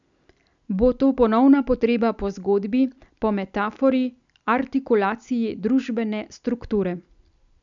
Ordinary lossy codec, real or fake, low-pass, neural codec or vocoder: none; real; 7.2 kHz; none